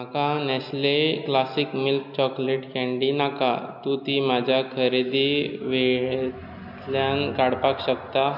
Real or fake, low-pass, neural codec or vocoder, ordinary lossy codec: real; 5.4 kHz; none; none